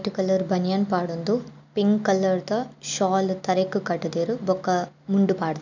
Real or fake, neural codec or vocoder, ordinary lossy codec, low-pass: real; none; none; 7.2 kHz